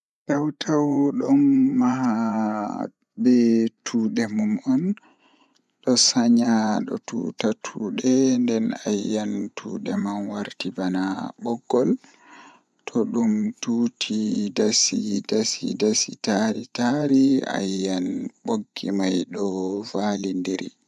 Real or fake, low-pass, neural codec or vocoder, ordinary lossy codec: fake; none; vocoder, 24 kHz, 100 mel bands, Vocos; none